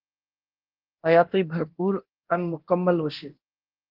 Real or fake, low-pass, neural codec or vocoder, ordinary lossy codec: fake; 5.4 kHz; codec, 24 kHz, 0.9 kbps, DualCodec; Opus, 16 kbps